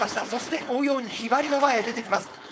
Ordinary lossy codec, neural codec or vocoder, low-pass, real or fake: none; codec, 16 kHz, 4.8 kbps, FACodec; none; fake